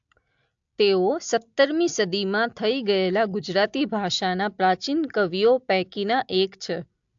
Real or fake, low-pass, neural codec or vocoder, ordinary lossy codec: real; 7.2 kHz; none; AAC, 64 kbps